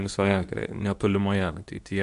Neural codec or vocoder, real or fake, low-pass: codec, 24 kHz, 0.9 kbps, WavTokenizer, medium speech release version 2; fake; 10.8 kHz